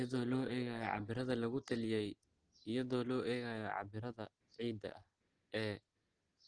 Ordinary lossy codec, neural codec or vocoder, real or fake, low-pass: Opus, 16 kbps; none; real; 9.9 kHz